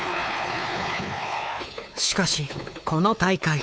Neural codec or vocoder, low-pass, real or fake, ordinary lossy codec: codec, 16 kHz, 4 kbps, X-Codec, WavLM features, trained on Multilingual LibriSpeech; none; fake; none